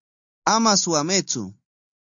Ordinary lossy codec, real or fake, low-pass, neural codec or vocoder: AAC, 64 kbps; real; 7.2 kHz; none